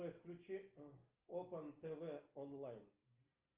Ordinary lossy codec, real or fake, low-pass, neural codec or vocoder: Opus, 32 kbps; real; 3.6 kHz; none